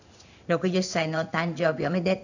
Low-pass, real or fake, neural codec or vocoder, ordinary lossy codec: 7.2 kHz; fake; codec, 16 kHz in and 24 kHz out, 1 kbps, XY-Tokenizer; none